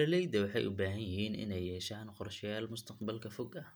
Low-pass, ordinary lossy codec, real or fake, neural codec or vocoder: none; none; real; none